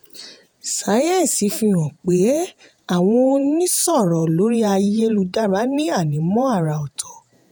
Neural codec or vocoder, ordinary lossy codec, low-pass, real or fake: vocoder, 48 kHz, 128 mel bands, Vocos; none; none; fake